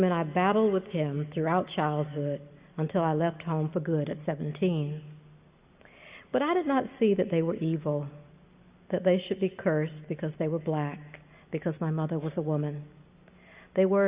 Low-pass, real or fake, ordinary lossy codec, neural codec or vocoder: 3.6 kHz; real; Opus, 64 kbps; none